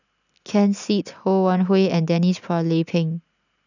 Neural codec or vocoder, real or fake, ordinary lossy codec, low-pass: none; real; none; 7.2 kHz